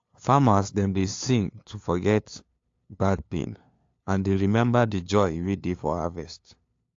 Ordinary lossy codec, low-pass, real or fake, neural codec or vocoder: AAC, 48 kbps; 7.2 kHz; fake; codec, 16 kHz, 2 kbps, FunCodec, trained on LibriTTS, 25 frames a second